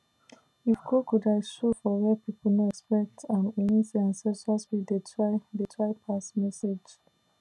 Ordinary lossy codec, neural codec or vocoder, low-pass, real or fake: none; none; none; real